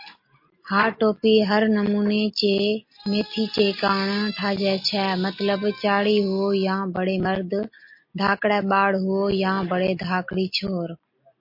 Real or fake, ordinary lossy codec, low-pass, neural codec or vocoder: real; MP3, 32 kbps; 5.4 kHz; none